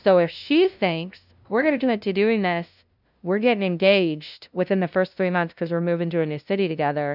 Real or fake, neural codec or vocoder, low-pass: fake; codec, 16 kHz, 0.5 kbps, FunCodec, trained on LibriTTS, 25 frames a second; 5.4 kHz